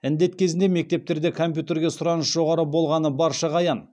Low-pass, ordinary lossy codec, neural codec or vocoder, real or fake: none; none; none; real